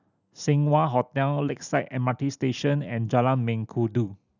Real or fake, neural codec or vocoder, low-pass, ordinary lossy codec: real; none; 7.2 kHz; none